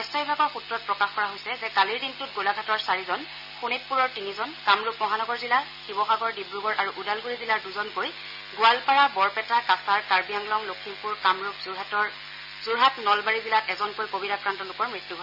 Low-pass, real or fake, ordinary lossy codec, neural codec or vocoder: 5.4 kHz; real; none; none